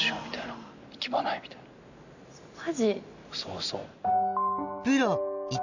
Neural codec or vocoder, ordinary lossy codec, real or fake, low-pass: none; none; real; 7.2 kHz